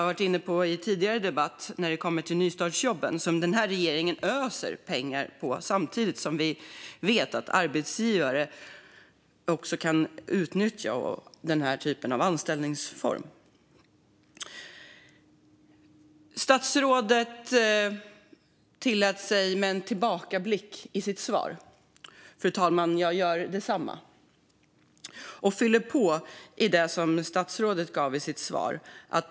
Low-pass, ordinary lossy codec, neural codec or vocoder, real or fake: none; none; none; real